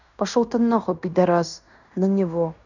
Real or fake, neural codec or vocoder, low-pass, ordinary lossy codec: fake; codec, 16 kHz in and 24 kHz out, 0.9 kbps, LongCat-Audio-Codec, fine tuned four codebook decoder; 7.2 kHz; none